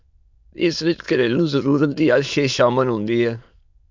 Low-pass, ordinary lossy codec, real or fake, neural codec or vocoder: 7.2 kHz; MP3, 64 kbps; fake; autoencoder, 22.05 kHz, a latent of 192 numbers a frame, VITS, trained on many speakers